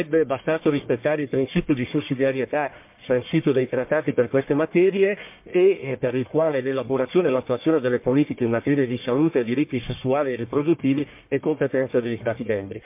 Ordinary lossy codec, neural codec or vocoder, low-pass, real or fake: MP3, 32 kbps; codec, 44.1 kHz, 1.7 kbps, Pupu-Codec; 3.6 kHz; fake